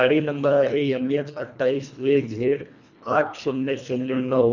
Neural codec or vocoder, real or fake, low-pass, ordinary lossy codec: codec, 24 kHz, 1.5 kbps, HILCodec; fake; 7.2 kHz; none